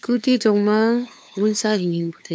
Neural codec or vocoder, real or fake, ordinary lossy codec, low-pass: codec, 16 kHz, 2 kbps, FunCodec, trained on LibriTTS, 25 frames a second; fake; none; none